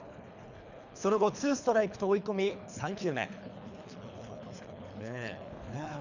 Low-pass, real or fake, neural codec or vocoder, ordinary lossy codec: 7.2 kHz; fake; codec, 24 kHz, 3 kbps, HILCodec; none